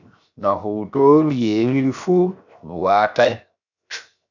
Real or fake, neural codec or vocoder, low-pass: fake; codec, 16 kHz, 0.7 kbps, FocalCodec; 7.2 kHz